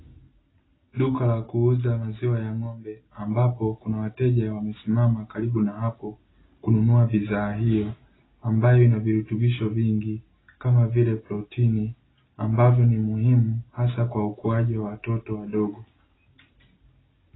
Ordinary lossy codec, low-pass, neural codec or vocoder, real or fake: AAC, 16 kbps; 7.2 kHz; none; real